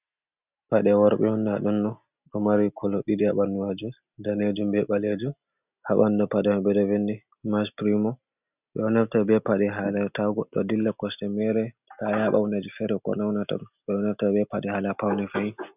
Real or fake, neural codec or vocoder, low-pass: real; none; 3.6 kHz